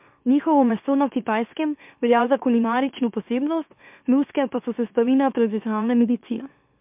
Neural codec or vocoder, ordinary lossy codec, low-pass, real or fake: autoencoder, 44.1 kHz, a latent of 192 numbers a frame, MeloTTS; MP3, 32 kbps; 3.6 kHz; fake